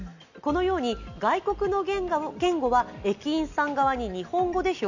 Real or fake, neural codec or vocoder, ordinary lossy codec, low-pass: real; none; none; 7.2 kHz